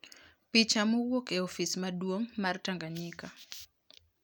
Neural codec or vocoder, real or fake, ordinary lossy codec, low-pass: none; real; none; none